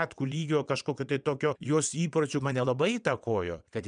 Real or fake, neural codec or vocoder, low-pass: fake; vocoder, 22.05 kHz, 80 mel bands, WaveNeXt; 9.9 kHz